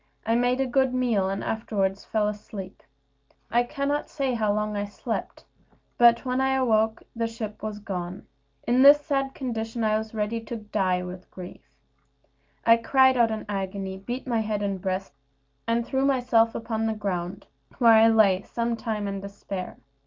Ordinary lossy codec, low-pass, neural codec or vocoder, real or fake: Opus, 24 kbps; 7.2 kHz; none; real